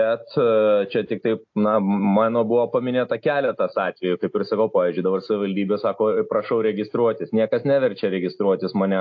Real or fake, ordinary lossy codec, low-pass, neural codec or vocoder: real; AAC, 48 kbps; 7.2 kHz; none